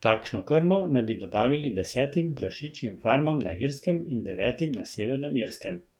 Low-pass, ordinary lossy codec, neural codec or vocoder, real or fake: 19.8 kHz; none; codec, 44.1 kHz, 2.6 kbps, DAC; fake